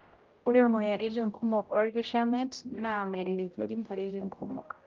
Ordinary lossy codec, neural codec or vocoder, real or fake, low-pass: Opus, 32 kbps; codec, 16 kHz, 0.5 kbps, X-Codec, HuBERT features, trained on general audio; fake; 7.2 kHz